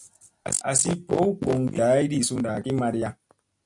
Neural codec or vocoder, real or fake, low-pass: none; real; 10.8 kHz